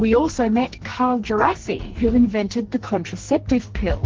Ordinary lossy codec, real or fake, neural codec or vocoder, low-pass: Opus, 16 kbps; fake; codec, 44.1 kHz, 2.6 kbps, SNAC; 7.2 kHz